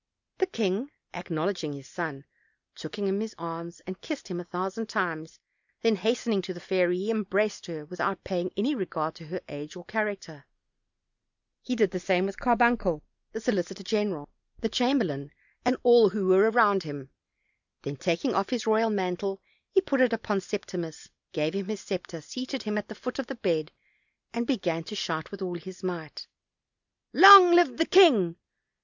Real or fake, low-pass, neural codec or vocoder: real; 7.2 kHz; none